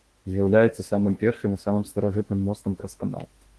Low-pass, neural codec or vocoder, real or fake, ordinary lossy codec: 10.8 kHz; autoencoder, 48 kHz, 32 numbers a frame, DAC-VAE, trained on Japanese speech; fake; Opus, 16 kbps